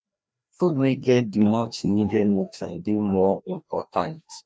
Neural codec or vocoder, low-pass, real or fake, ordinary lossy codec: codec, 16 kHz, 1 kbps, FreqCodec, larger model; none; fake; none